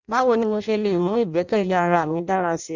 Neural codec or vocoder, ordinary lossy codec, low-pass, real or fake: codec, 16 kHz in and 24 kHz out, 0.6 kbps, FireRedTTS-2 codec; none; 7.2 kHz; fake